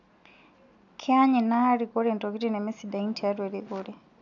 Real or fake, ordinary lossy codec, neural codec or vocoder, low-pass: real; none; none; 7.2 kHz